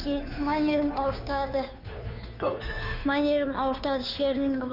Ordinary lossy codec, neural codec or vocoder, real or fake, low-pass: none; codec, 16 kHz, 2 kbps, FunCodec, trained on Chinese and English, 25 frames a second; fake; 5.4 kHz